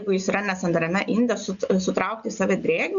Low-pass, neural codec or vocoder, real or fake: 7.2 kHz; none; real